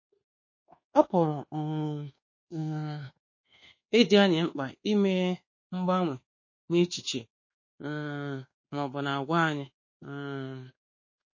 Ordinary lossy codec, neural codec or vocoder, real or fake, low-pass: MP3, 32 kbps; codec, 24 kHz, 1.2 kbps, DualCodec; fake; 7.2 kHz